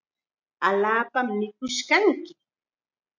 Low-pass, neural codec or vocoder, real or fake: 7.2 kHz; none; real